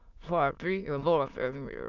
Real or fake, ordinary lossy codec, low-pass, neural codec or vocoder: fake; none; 7.2 kHz; autoencoder, 22.05 kHz, a latent of 192 numbers a frame, VITS, trained on many speakers